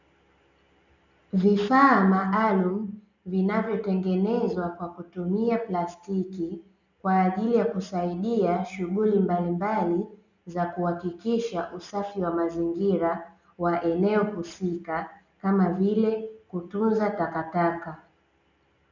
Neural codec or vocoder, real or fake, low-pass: none; real; 7.2 kHz